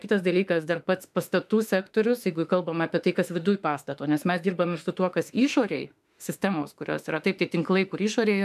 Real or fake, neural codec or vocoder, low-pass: fake; autoencoder, 48 kHz, 32 numbers a frame, DAC-VAE, trained on Japanese speech; 14.4 kHz